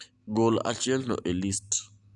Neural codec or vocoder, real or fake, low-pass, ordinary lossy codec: none; real; 10.8 kHz; none